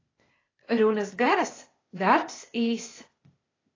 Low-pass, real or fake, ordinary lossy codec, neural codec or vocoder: 7.2 kHz; fake; AAC, 32 kbps; codec, 16 kHz, 0.8 kbps, ZipCodec